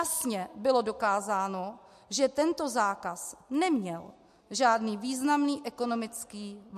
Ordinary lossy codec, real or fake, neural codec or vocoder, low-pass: MP3, 64 kbps; real; none; 14.4 kHz